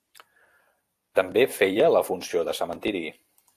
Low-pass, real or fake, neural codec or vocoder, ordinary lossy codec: 14.4 kHz; fake; vocoder, 44.1 kHz, 128 mel bands every 256 samples, BigVGAN v2; AAC, 64 kbps